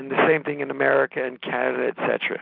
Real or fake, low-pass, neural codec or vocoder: real; 5.4 kHz; none